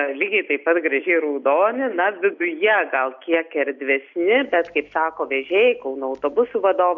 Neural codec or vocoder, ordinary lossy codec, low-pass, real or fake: none; MP3, 64 kbps; 7.2 kHz; real